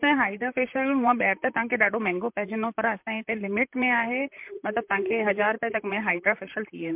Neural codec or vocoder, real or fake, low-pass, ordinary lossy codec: vocoder, 44.1 kHz, 128 mel bands, Pupu-Vocoder; fake; 3.6 kHz; MP3, 32 kbps